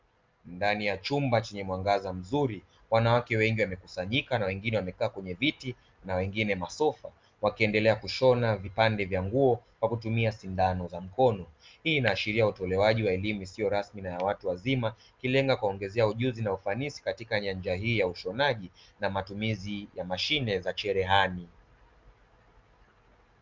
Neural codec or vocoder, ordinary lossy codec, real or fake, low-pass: none; Opus, 24 kbps; real; 7.2 kHz